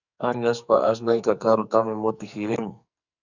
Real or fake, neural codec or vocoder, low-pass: fake; codec, 44.1 kHz, 2.6 kbps, SNAC; 7.2 kHz